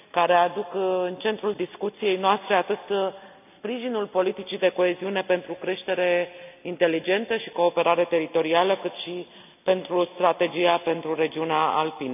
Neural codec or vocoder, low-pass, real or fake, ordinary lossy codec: none; 3.6 kHz; real; none